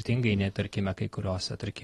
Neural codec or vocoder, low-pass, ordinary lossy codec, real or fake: none; 19.8 kHz; AAC, 32 kbps; real